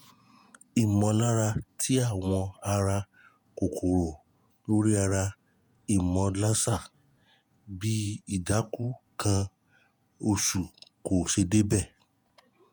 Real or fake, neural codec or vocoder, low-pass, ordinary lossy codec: fake; vocoder, 48 kHz, 128 mel bands, Vocos; none; none